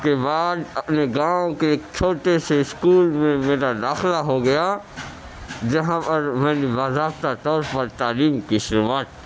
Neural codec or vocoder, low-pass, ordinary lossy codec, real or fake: none; none; none; real